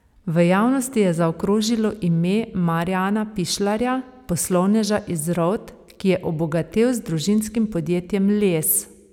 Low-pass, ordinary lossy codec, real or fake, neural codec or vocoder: 19.8 kHz; none; real; none